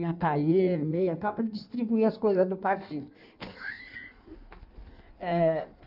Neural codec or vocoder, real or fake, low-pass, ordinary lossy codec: codec, 16 kHz in and 24 kHz out, 1.1 kbps, FireRedTTS-2 codec; fake; 5.4 kHz; none